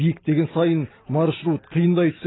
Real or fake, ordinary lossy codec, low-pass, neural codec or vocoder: real; AAC, 16 kbps; 7.2 kHz; none